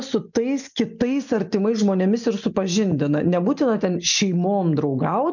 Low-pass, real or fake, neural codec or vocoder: 7.2 kHz; real; none